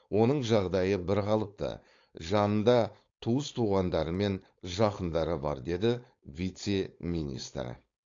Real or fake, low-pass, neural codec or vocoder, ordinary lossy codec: fake; 7.2 kHz; codec, 16 kHz, 4.8 kbps, FACodec; AAC, 48 kbps